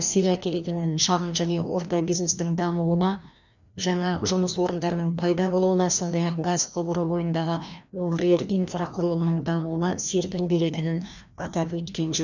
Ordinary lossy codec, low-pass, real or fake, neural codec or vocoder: none; 7.2 kHz; fake; codec, 16 kHz, 1 kbps, FreqCodec, larger model